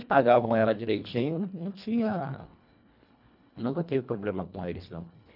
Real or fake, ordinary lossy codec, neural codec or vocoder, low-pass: fake; none; codec, 24 kHz, 1.5 kbps, HILCodec; 5.4 kHz